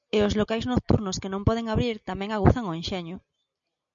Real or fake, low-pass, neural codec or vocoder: real; 7.2 kHz; none